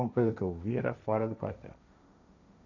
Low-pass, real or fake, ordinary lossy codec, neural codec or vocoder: 7.2 kHz; fake; none; codec, 16 kHz, 1.1 kbps, Voila-Tokenizer